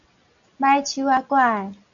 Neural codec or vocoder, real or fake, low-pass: none; real; 7.2 kHz